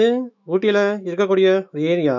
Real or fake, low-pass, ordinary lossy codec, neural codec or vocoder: fake; 7.2 kHz; none; autoencoder, 48 kHz, 128 numbers a frame, DAC-VAE, trained on Japanese speech